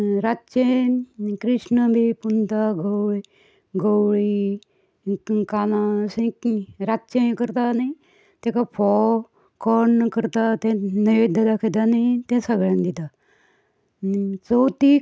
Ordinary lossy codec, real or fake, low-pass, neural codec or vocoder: none; real; none; none